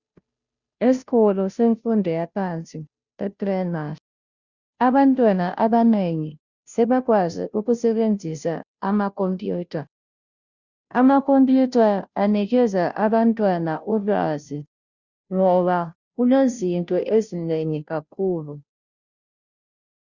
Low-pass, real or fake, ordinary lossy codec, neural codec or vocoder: 7.2 kHz; fake; Opus, 64 kbps; codec, 16 kHz, 0.5 kbps, FunCodec, trained on Chinese and English, 25 frames a second